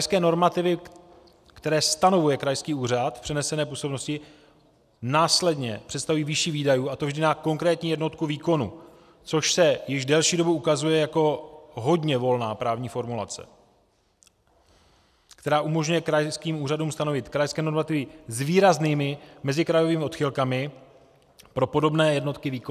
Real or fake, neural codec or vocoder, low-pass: real; none; 14.4 kHz